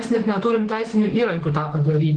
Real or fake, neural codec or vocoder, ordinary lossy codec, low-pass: fake; autoencoder, 48 kHz, 32 numbers a frame, DAC-VAE, trained on Japanese speech; Opus, 16 kbps; 10.8 kHz